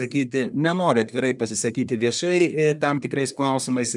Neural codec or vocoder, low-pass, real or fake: codec, 24 kHz, 1 kbps, SNAC; 10.8 kHz; fake